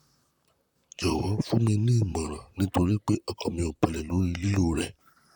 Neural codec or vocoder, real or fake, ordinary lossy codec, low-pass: vocoder, 44.1 kHz, 128 mel bands, Pupu-Vocoder; fake; none; 19.8 kHz